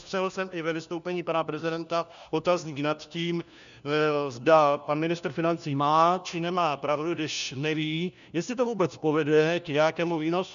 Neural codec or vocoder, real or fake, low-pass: codec, 16 kHz, 1 kbps, FunCodec, trained on LibriTTS, 50 frames a second; fake; 7.2 kHz